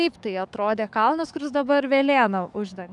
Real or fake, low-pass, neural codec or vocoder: fake; 10.8 kHz; codec, 44.1 kHz, 7.8 kbps, DAC